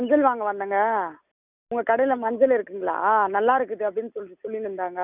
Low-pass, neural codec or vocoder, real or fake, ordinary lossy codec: 3.6 kHz; none; real; none